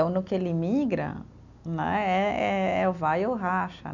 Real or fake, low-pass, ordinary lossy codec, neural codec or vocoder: real; 7.2 kHz; none; none